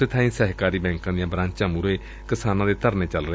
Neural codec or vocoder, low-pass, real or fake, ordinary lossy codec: none; none; real; none